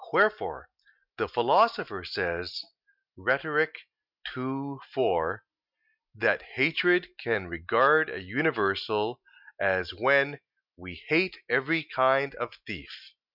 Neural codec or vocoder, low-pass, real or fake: none; 5.4 kHz; real